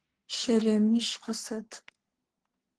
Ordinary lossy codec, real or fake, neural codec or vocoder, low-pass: Opus, 16 kbps; fake; codec, 44.1 kHz, 3.4 kbps, Pupu-Codec; 10.8 kHz